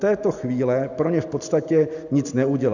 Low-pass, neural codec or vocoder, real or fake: 7.2 kHz; none; real